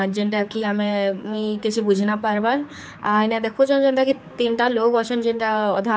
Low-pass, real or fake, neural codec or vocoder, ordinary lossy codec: none; fake; codec, 16 kHz, 4 kbps, X-Codec, HuBERT features, trained on general audio; none